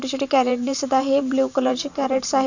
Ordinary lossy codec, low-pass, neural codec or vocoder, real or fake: none; 7.2 kHz; vocoder, 44.1 kHz, 128 mel bands every 512 samples, BigVGAN v2; fake